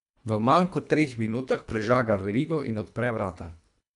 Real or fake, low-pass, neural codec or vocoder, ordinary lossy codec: fake; 10.8 kHz; codec, 24 kHz, 1.5 kbps, HILCodec; none